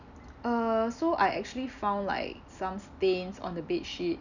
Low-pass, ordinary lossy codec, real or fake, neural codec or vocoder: 7.2 kHz; none; real; none